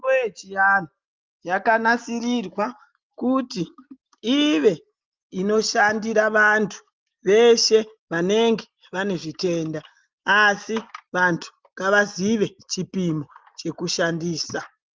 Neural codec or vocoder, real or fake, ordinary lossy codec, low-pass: none; real; Opus, 24 kbps; 7.2 kHz